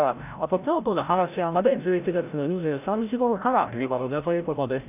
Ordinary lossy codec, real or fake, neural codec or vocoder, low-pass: AAC, 32 kbps; fake; codec, 16 kHz, 0.5 kbps, FreqCodec, larger model; 3.6 kHz